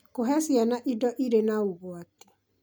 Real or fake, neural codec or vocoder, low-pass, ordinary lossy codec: real; none; none; none